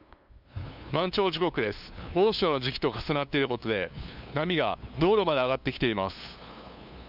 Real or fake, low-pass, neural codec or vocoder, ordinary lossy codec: fake; 5.4 kHz; codec, 16 kHz, 2 kbps, FunCodec, trained on LibriTTS, 25 frames a second; none